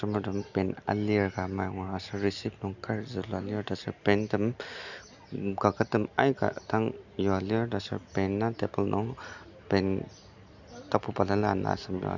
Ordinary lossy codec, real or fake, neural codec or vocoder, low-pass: none; real; none; 7.2 kHz